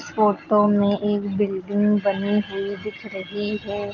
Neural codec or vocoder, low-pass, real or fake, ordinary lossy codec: none; 7.2 kHz; real; Opus, 32 kbps